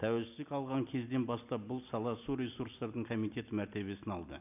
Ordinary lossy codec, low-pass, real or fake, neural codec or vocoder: none; 3.6 kHz; real; none